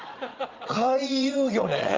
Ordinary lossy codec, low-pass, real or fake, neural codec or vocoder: Opus, 32 kbps; 7.2 kHz; fake; vocoder, 22.05 kHz, 80 mel bands, Vocos